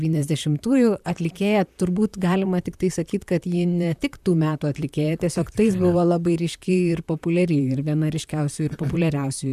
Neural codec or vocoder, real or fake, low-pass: vocoder, 44.1 kHz, 128 mel bands, Pupu-Vocoder; fake; 14.4 kHz